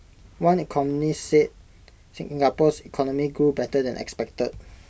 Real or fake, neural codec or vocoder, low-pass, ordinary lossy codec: real; none; none; none